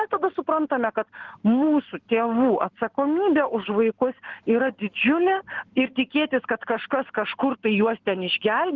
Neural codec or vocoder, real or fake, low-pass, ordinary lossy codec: none; real; 7.2 kHz; Opus, 16 kbps